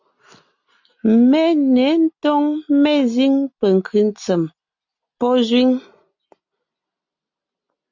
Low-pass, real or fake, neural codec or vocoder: 7.2 kHz; real; none